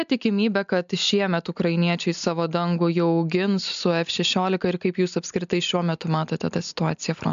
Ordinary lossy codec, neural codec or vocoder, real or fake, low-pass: AAC, 96 kbps; none; real; 7.2 kHz